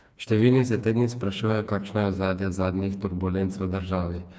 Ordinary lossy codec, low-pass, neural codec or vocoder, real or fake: none; none; codec, 16 kHz, 2 kbps, FreqCodec, smaller model; fake